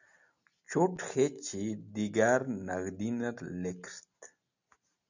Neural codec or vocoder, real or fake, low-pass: none; real; 7.2 kHz